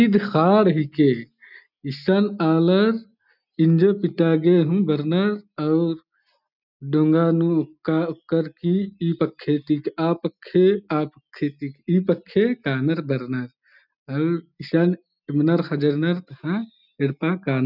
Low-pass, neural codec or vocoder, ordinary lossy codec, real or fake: 5.4 kHz; none; none; real